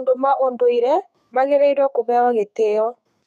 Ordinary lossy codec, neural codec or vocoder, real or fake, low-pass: none; codec, 32 kHz, 1.9 kbps, SNAC; fake; 14.4 kHz